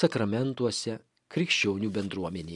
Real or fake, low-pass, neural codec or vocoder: real; 10.8 kHz; none